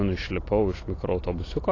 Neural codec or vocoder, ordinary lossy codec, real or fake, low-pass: none; AAC, 32 kbps; real; 7.2 kHz